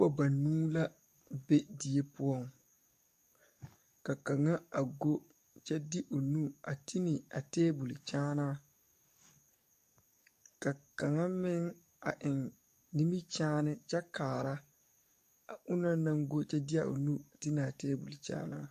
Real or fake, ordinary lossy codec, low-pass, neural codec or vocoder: fake; MP3, 64 kbps; 14.4 kHz; codec, 44.1 kHz, 7.8 kbps, DAC